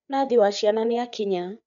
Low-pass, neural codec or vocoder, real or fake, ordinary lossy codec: 7.2 kHz; codec, 16 kHz, 4 kbps, FreqCodec, larger model; fake; none